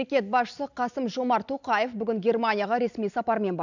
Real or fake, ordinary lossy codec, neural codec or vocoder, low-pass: real; none; none; 7.2 kHz